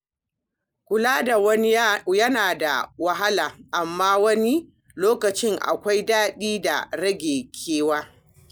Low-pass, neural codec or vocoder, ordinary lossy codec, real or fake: none; none; none; real